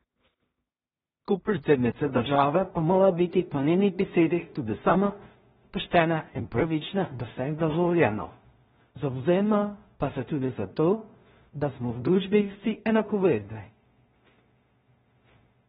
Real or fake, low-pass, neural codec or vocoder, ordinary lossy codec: fake; 10.8 kHz; codec, 16 kHz in and 24 kHz out, 0.4 kbps, LongCat-Audio-Codec, two codebook decoder; AAC, 16 kbps